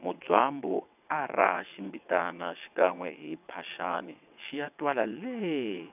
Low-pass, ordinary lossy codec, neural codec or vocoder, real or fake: 3.6 kHz; none; vocoder, 22.05 kHz, 80 mel bands, WaveNeXt; fake